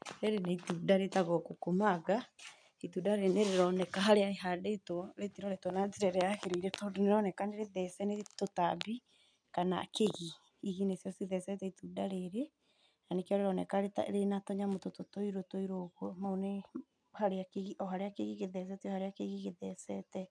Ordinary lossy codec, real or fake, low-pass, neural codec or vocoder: none; real; 9.9 kHz; none